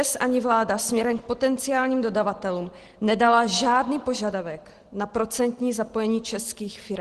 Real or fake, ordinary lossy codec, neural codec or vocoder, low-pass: real; Opus, 16 kbps; none; 10.8 kHz